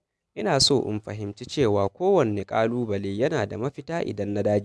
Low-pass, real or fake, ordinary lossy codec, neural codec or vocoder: none; real; none; none